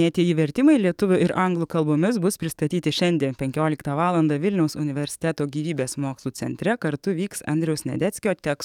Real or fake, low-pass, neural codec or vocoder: fake; 19.8 kHz; codec, 44.1 kHz, 7.8 kbps, DAC